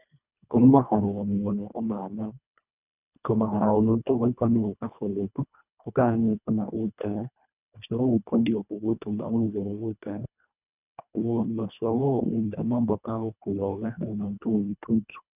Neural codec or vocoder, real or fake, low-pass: codec, 24 kHz, 1.5 kbps, HILCodec; fake; 3.6 kHz